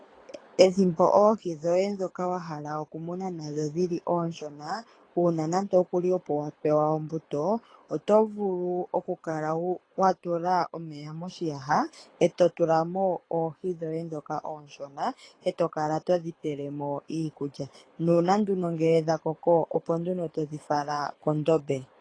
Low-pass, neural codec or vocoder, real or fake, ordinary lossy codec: 9.9 kHz; codec, 24 kHz, 6 kbps, HILCodec; fake; AAC, 32 kbps